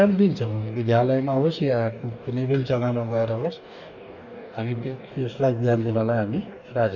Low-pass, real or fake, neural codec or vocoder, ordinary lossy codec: 7.2 kHz; fake; codec, 44.1 kHz, 2.6 kbps, DAC; none